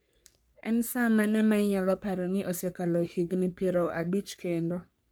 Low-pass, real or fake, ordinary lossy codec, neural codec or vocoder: none; fake; none; codec, 44.1 kHz, 3.4 kbps, Pupu-Codec